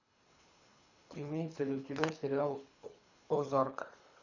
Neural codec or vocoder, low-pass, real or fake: codec, 24 kHz, 3 kbps, HILCodec; 7.2 kHz; fake